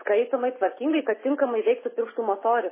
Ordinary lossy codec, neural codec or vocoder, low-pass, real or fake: MP3, 16 kbps; none; 3.6 kHz; real